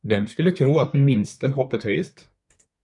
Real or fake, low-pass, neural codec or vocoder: fake; 10.8 kHz; codec, 24 kHz, 1 kbps, SNAC